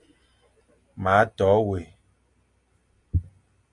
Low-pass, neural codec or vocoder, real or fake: 10.8 kHz; none; real